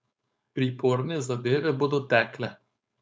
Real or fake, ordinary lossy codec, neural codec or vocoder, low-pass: fake; none; codec, 16 kHz, 4.8 kbps, FACodec; none